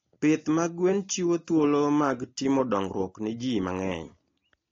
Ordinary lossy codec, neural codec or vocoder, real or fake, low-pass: AAC, 32 kbps; none; real; 7.2 kHz